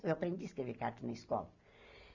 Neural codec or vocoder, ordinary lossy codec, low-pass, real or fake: none; none; 7.2 kHz; real